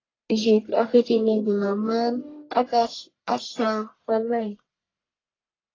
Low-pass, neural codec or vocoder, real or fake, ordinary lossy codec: 7.2 kHz; codec, 44.1 kHz, 1.7 kbps, Pupu-Codec; fake; AAC, 32 kbps